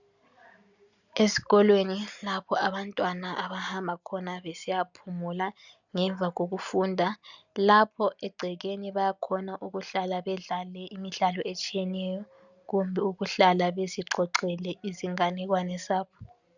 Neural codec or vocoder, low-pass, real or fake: none; 7.2 kHz; real